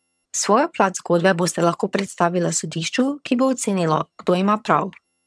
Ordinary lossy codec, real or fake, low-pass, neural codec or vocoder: none; fake; none; vocoder, 22.05 kHz, 80 mel bands, HiFi-GAN